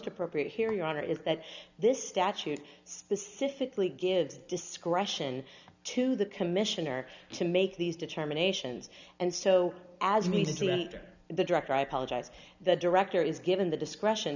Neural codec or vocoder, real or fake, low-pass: none; real; 7.2 kHz